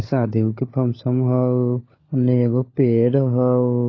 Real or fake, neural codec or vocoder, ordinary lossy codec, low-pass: fake; codec, 16 kHz, 16 kbps, FunCodec, trained on LibriTTS, 50 frames a second; AAC, 32 kbps; 7.2 kHz